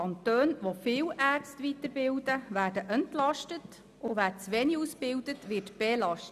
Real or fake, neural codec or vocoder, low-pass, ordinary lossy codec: real; none; 14.4 kHz; none